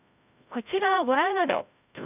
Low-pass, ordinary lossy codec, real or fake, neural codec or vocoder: 3.6 kHz; none; fake; codec, 16 kHz, 0.5 kbps, FreqCodec, larger model